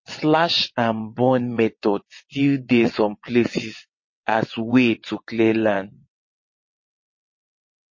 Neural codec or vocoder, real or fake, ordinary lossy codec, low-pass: vocoder, 22.05 kHz, 80 mel bands, WaveNeXt; fake; MP3, 32 kbps; 7.2 kHz